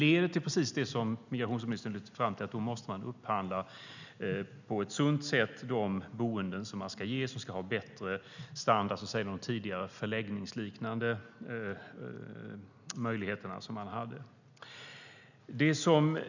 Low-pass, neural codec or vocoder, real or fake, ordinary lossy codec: 7.2 kHz; none; real; none